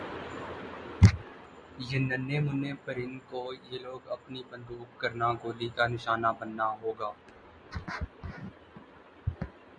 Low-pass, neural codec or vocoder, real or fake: 9.9 kHz; none; real